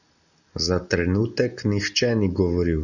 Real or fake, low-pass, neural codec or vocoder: real; 7.2 kHz; none